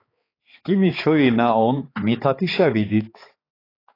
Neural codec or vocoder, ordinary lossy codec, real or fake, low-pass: codec, 16 kHz, 4 kbps, X-Codec, HuBERT features, trained on balanced general audio; AAC, 24 kbps; fake; 5.4 kHz